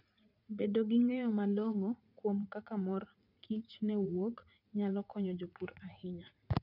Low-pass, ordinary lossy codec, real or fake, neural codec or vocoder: 5.4 kHz; none; fake; vocoder, 44.1 kHz, 80 mel bands, Vocos